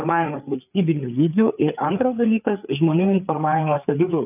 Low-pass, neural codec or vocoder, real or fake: 3.6 kHz; codec, 16 kHz, 4 kbps, FreqCodec, larger model; fake